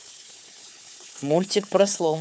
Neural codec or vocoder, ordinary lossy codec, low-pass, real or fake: codec, 16 kHz, 4 kbps, FunCodec, trained on Chinese and English, 50 frames a second; none; none; fake